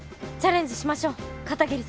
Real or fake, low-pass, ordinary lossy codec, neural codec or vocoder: real; none; none; none